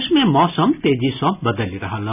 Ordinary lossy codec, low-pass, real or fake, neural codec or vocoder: none; 3.6 kHz; real; none